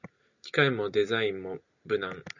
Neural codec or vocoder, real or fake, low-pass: none; real; 7.2 kHz